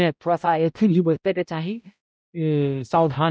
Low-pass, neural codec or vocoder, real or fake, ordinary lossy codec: none; codec, 16 kHz, 0.5 kbps, X-Codec, HuBERT features, trained on balanced general audio; fake; none